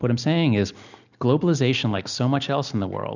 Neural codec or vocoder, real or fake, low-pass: none; real; 7.2 kHz